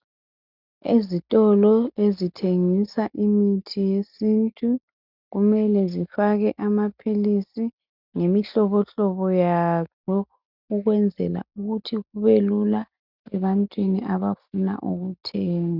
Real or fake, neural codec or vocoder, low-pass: real; none; 5.4 kHz